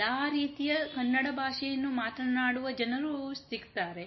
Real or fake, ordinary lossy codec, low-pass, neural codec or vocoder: real; MP3, 24 kbps; 7.2 kHz; none